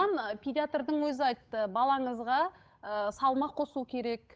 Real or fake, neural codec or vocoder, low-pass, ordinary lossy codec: fake; codec, 16 kHz, 8 kbps, FunCodec, trained on Chinese and English, 25 frames a second; none; none